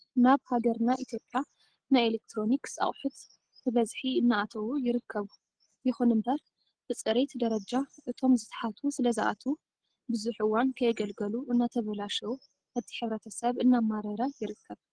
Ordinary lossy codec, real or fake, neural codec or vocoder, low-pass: Opus, 16 kbps; real; none; 9.9 kHz